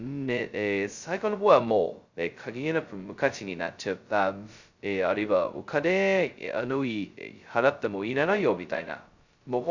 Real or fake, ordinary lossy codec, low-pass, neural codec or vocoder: fake; Opus, 64 kbps; 7.2 kHz; codec, 16 kHz, 0.2 kbps, FocalCodec